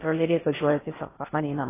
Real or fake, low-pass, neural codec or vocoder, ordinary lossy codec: fake; 3.6 kHz; codec, 16 kHz in and 24 kHz out, 0.6 kbps, FocalCodec, streaming, 4096 codes; AAC, 16 kbps